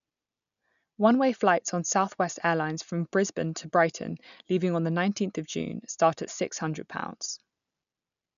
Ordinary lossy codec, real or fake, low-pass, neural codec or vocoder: none; real; 7.2 kHz; none